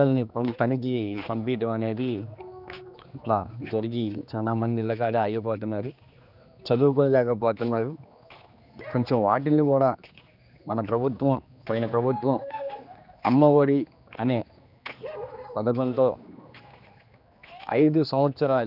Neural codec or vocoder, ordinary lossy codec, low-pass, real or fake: codec, 16 kHz, 2 kbps, X-Codec, HuBERT features, trained on general audio; none; 5.4 kHz; fake